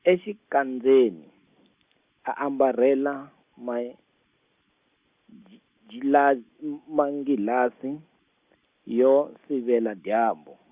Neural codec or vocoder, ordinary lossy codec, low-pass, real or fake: none; Opus, 64 kbps; 3.6 kHz; real